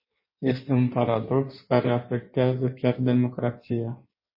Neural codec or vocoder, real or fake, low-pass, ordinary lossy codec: codec, 16 kHz in and 24 kHz out, 1.1 kbps, FireRedTTS-2 codec; fake; 5.4 kHz; MP3, 24 kbps